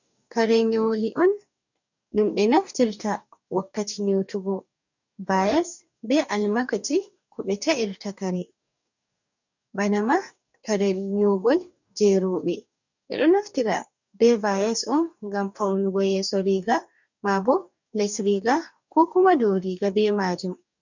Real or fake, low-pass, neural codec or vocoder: fake; 7.2 kHz; codec, 44.1 kHz, 2.6 kbps, DAC